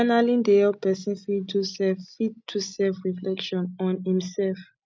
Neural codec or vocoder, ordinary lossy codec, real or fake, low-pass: none; none; real; none